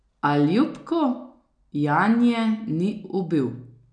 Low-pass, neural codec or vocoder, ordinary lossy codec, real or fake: 9.9 kHz; none; none; real